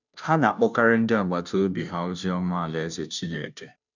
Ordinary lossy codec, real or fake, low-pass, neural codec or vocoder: none; fake; 7.2 kHz; codec, 16 kHz, 0.5 kbps, FunCodec, trained on Chinese and English, 25 frames a second